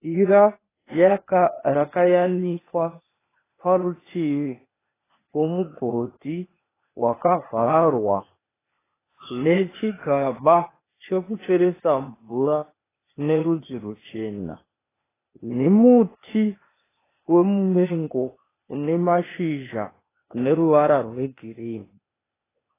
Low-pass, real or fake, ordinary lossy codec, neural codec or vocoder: 3.6 kHz; fake; AAC, 16 kbps; codec, 16 kHz, 0.8 kbps, ZipCodec